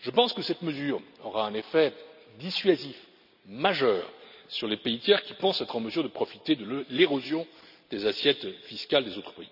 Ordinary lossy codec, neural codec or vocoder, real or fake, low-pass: none; none; real; 5.4 kHz